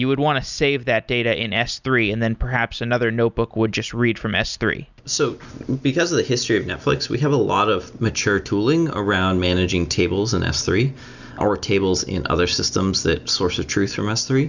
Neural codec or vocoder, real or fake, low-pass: none; real; 7.2 kHz